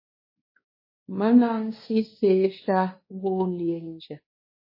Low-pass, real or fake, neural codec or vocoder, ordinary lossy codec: 5.4 kHz; fake; codec, 16 kHz, 2 kbps, X-Codec, WavLM features, trained on Multilingual LibriSpeech; MP3, 24 kbps